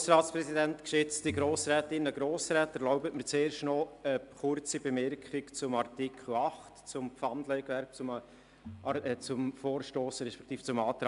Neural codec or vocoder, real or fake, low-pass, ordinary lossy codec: none; real; 10.8 kHz; AAC, 96 kbps